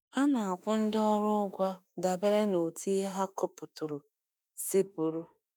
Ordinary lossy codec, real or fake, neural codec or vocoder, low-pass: none; fake; autoencoder, 48 kHz, 32 numbers a frame, DAC-VAE, trained on Japanese speech; none